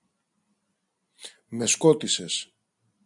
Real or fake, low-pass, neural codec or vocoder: real; 10.8 kHz; none